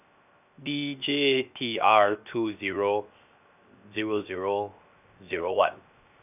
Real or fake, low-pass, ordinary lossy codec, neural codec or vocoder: fake; 3.6 kHz; none; codec, 16 kHz, 0.7 kbps, FocalCodec